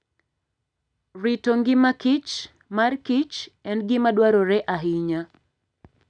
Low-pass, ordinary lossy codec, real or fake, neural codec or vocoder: 9.9 kHz; none; real; none